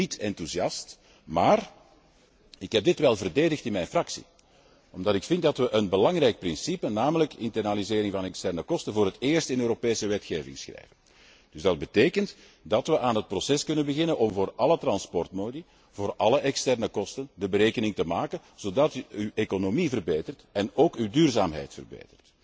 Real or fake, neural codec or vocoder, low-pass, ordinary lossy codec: real; none; none; none